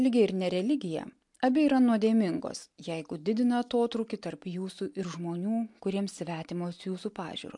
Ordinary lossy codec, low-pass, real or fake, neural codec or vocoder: MP3, 64 kbps; 10.8 kHz; real; none